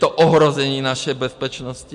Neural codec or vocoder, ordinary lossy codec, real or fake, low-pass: none; MP3, 64 kbps; real; 10.8 kHz